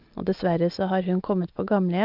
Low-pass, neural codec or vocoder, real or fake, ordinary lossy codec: 5.4 kHz; none; real; Opus, 24 kbps